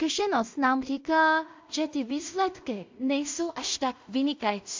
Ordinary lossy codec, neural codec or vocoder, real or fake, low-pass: MP3, 48 kbps; codec, 16 kHz in and 24 kHz out, 0.4 kbps, LongCat-Audio-Codec, two codebook decoder; fake; 7.2 kHz